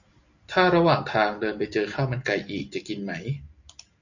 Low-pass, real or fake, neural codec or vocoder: 7.2 kHz; real; none